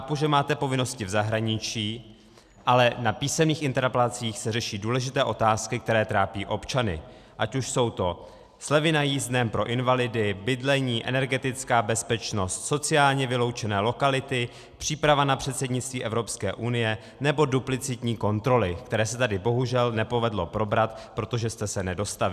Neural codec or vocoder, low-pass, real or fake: none; 14.4 kHz; real